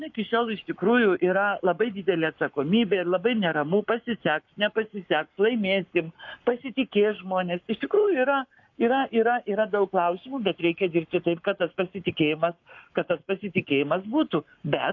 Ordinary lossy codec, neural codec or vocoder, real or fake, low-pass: AAC, 48 kbps; codec, 44.1 kHz, 7.8 kbps, Pupu-Codec; fake; 7.2 kHz